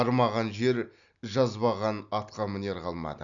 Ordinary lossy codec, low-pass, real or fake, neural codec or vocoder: none; 7.2 kHz; real; none